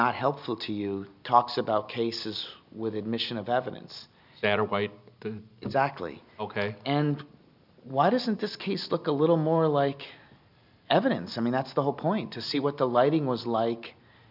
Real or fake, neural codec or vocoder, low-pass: real; none; 5.4 kHz